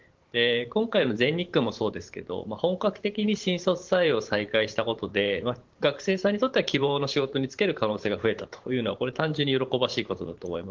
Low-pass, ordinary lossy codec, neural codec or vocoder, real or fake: 7.2 kHz; Opus, 16 kbps; vocoder, 44.1 kHz, 128 mel bands every 512 samples, BigVGAN v2; fake